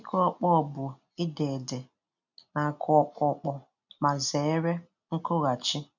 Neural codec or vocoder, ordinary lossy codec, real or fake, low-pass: none; none; real; 7.2 kHz